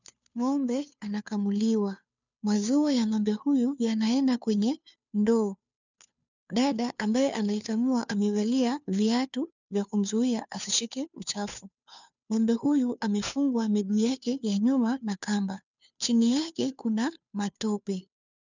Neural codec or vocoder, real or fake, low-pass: codec, 16 kHz, 2 kbps, FunCodec, trained on Chinese and English, 25 frames a second; fake; 7.2 kHz